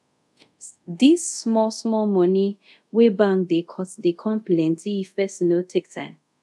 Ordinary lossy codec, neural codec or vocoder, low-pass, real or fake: none; codec, 24 kHz, 0.5 kbps, DualCodec; none; fake